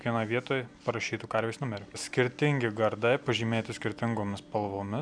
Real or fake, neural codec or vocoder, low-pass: real; none; 9.9 kHz